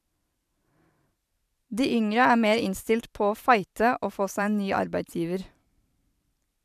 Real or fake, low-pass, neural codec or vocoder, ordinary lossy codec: fake; 14.4 kHz; vocoder, 44.1 kHz, 128 mel bands every 512 samples, BigVGAN v2; none